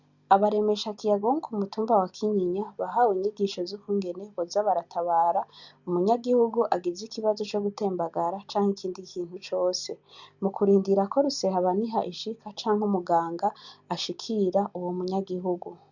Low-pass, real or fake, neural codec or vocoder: 7.2 kHz; real; none